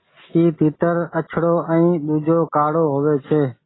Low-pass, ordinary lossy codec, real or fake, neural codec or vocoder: 7.2 kHz; AAC, 16 kbps; real; none